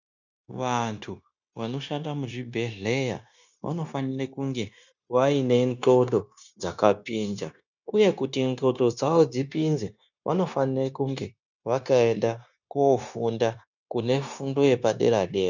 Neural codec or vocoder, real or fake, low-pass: codec, 16 kHz, 0.9 kbps, LongCat-Audio-Codec; fake; 7.2 kHz